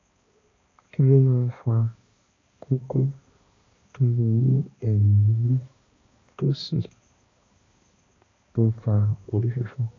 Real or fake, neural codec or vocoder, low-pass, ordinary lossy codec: fake; codec, 16 kHz, 1 kbps, X-Codec, HuBERT features, trained on balanced general audio; 7.2 kHz; AAC, 32 kbps